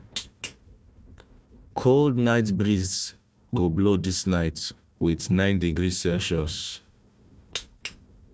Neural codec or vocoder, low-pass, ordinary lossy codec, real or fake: codec, 16 kHz, 1 kbps, FunCodec, trained on Chinese and English, 50 frames a second; none; none; fake